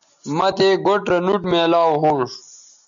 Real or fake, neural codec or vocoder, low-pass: real; none; 7.2 kHz